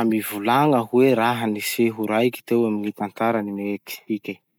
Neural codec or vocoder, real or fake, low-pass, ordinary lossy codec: none; real; none; none